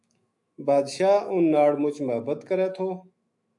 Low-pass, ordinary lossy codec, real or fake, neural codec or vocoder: 10.8 kHz; AAC, 64 kbps; fake; autoencoder, 48 kHz, 128 numbers a frame, DAC-VAE, trained on Japanese speech